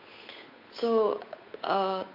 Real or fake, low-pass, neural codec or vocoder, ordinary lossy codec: fake; 5.4 kHz; codec, 16 kHz, 8 kbps, FunCodec, trained on Chinese and English, 25 frames a second; none